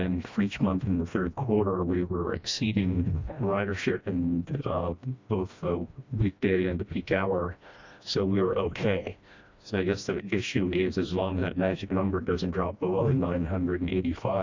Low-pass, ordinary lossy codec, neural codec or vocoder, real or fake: 7.2 kHz; MP3, 64 kbps; codec, 16 kHz, 1 kbps, FreqCodec, smaller model; fake